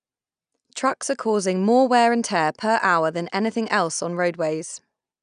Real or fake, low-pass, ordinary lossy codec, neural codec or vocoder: real; 9.9 kHz; none; none